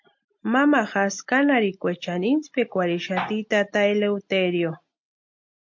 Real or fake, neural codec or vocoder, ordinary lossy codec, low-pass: real; none; MP3, 48 kbps; 7.2 kHz